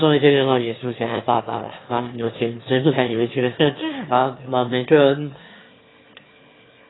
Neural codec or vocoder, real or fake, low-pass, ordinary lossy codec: autoencoder, 22.05 kHz, a latent of 192 numbers a frame, VITS, trained on one speaker; fake; 7.2 kHz; AAC, 16 kbps